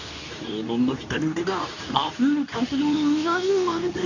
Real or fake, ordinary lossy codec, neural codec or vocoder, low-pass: fake; none; codec, 24 kHz, 0.9 kbps, WavTokenizer, medium speech release version 2; 7.2 kHz